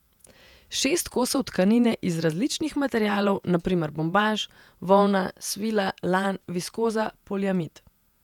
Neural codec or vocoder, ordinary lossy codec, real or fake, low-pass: vocoder, 48 kHz, 128 mel bands, Vocos; none; fake; 19.8 kHz